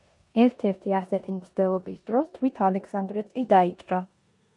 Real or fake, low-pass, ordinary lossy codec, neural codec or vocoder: fake; 10.8 kHz; MP3, 64 kbps; codec, 16 kHz in and 24 kHz out, 0.9 kbps, LongCat-Audio-Codec, four codebook decoder